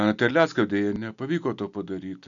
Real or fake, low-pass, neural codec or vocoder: real; 7.2 kHz; none